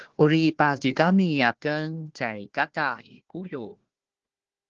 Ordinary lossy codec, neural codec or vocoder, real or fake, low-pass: Opus, 16 kbps; codec, 16 kHz, 1 kbps, FunCodec, trained on Chinese and English, 50 frames a second; fake; 7.2 kHz